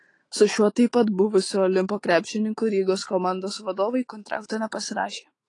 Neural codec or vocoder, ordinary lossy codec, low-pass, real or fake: autoencoder, 48 kHz, 128 numbers a frame, DAC-VAE, trained on Japanese speech; AAC, 32 kbps; 10.8 kHz; fake